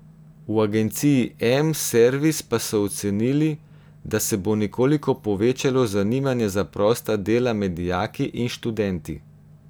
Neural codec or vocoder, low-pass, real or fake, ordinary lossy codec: none; none; real; none